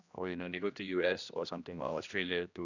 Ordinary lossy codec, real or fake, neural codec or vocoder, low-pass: none; fake; codec, 16 kHz, 1 kbps, X-Codec, HuBERT features, trained on general audio; 7.2 kHz